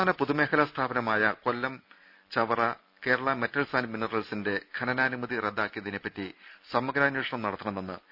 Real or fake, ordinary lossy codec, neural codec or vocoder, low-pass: real; none; none; 5.4 kHz